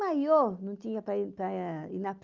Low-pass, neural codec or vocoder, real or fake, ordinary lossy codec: 7.2 kHz; none; real; Opus, 32 kbps